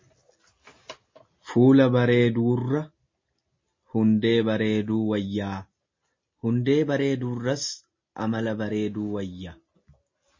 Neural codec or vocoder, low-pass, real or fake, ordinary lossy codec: none; 7.2 kHz; real; MP3, 32 kbps